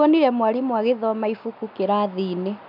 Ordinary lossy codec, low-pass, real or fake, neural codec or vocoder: none; 5.4 kHz; real; none